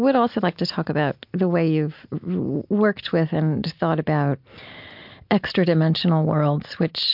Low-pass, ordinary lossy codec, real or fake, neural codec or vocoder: 5.4 kHz; MP3, 48 kbps; real; none